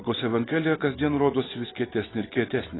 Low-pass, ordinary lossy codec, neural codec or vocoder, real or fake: 7.2 kHz; AAC, 16 kbps; none; real